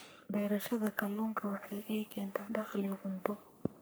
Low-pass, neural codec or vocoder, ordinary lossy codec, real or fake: none; codec, 44.1 kHz, 3.4 kbps, Pupu-Codec; none; fake